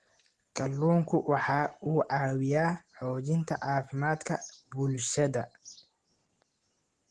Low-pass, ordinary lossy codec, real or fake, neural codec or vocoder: 9.9 kHz; Opus, 16 kbps; real; none